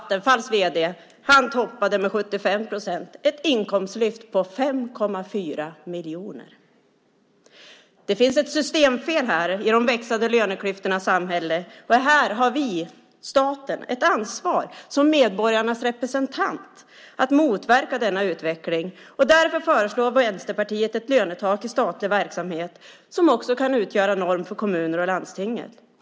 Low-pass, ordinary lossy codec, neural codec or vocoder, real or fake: none; none; none; real